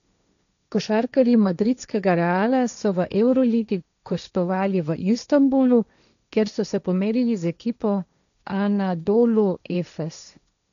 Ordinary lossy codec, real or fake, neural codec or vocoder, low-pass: none; fake; codec, 16 kHz, 1.1 kbps, Voila-Tokenizer; 7.2 kHz